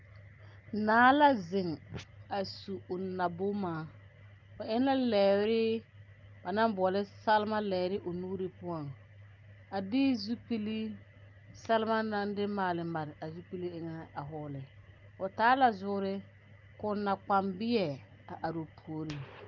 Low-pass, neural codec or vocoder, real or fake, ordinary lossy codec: 7.2 kHz; none; real; Opus, 24 kbps